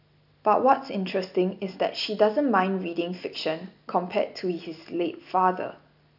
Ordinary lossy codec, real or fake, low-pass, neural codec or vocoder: none; real; 5.4 kHz; none